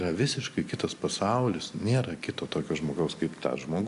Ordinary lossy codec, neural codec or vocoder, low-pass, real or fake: AAC, 64 kbps; none; 10.8 kHz; real